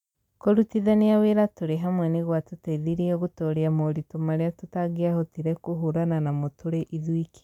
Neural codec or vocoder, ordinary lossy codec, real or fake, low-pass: none; none; real; 19.8 kHz